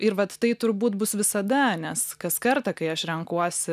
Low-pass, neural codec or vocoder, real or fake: 14.4 kHz; none; real